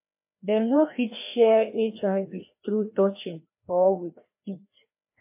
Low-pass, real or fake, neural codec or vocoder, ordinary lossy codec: 3.6 kHz; fake; codec, 16 kHz, 1 kbps, FreqCodec, larger model; MP3, 24 kbps